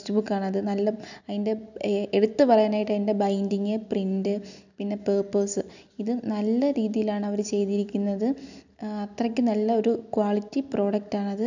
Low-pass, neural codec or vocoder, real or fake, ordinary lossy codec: 7.2 kHz; none; real; none